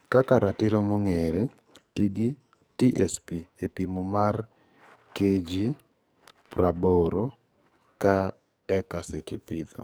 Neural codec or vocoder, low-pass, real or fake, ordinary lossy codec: codec, 44.1 kHz, 2.6 kbps, SNAC; none; fake; none